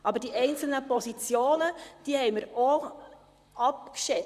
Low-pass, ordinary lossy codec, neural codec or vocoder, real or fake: 14.4 kHz; none; vocoder, 44.1 kHz, 128 mel bands, Pupu-Vocoder; fake